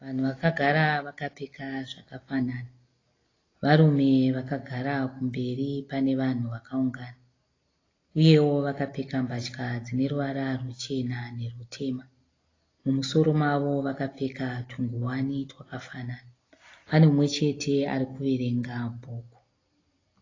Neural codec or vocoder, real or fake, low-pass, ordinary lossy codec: none; real; 7.2 kHz; AAC, 32 kbps